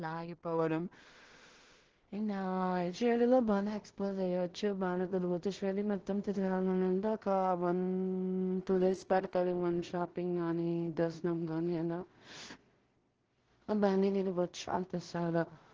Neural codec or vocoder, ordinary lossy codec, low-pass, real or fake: codec, 16 kHz in and 24 kHz out, 0.4 kbps, LongCat-Audio-Codec, two codebook decoder; Opus, 16 kbps; 7.2 kHz; fake